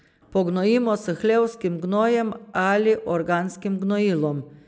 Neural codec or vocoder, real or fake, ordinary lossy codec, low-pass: none; real; none; none